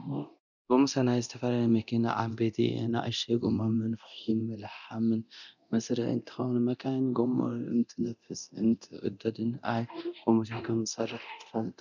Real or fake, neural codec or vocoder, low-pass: fake; codec, 24 kHz, 0.9 kbps, DualCodec; 7.2 kHz